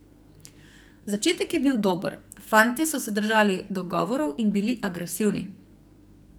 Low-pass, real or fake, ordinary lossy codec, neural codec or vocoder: none; fake; none; codec, 44.1 kHz, 2.6 kbps, SNAC